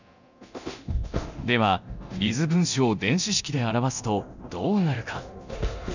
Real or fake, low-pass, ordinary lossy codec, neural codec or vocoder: fake; 7.2 kHz; none; codec, 24 kHz, 0.9 kbps, DualCodec